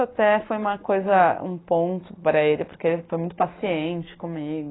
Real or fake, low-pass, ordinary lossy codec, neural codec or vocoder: real; 7.2 kHz; AAC, 16 kbps; none